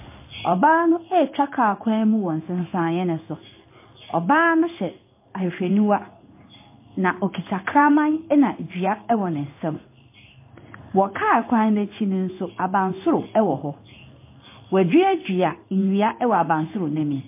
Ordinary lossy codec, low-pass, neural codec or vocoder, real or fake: MP3, 24 kbps; 3.6 kHz; codec, 16 kHz in and 24 kHz out, 1 kbps, XY-Tokenizer; fake